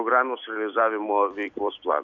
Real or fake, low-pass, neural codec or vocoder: fake; 7.2 kHz; vocoder, 44.1 kHz, 128 mel bands every 256 samples, BigVGAN v2